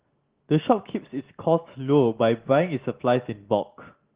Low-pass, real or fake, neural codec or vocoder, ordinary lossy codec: 3.6 kHz; real; none; Opus, 16 kbps